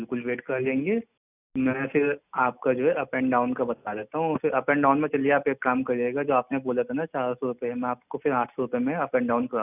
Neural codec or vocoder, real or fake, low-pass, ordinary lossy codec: none; real; 3.6 kHz; none